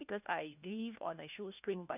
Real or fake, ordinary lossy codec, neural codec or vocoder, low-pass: fake; none; codec, 16 kHz, 1 kbps, FunCodec, trained on LibriTTS, 50 frames a second; 3.6 kHz